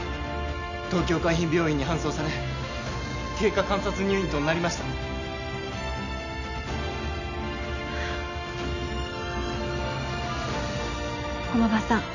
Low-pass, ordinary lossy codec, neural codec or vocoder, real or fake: 7.2 kHz; none; none; real